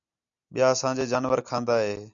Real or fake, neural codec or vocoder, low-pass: real; none; 7.2 kHz